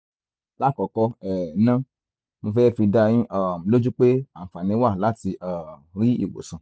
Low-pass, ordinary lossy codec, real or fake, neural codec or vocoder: none; none; real; none